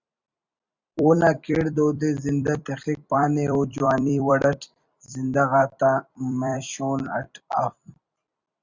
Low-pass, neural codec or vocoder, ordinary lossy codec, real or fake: 7.2 kHz; vocoder, 44.1 kHz, 128 mel bands every 256 samples, BigVGAN v2; Opus, 64 kbps; fake